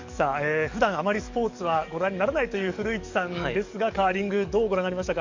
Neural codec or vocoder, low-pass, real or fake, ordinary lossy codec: codec, 44.1 kHz, 7.8 kbps, DAC; 7.2 kHz; fake; Opus, 64 kbps